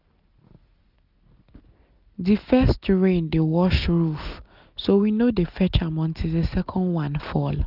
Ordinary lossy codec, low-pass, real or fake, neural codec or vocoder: none; 5.4 kHz; real; none